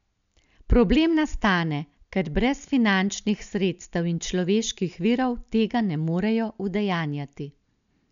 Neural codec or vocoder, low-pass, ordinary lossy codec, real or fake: none; 7.2 kHz; none; real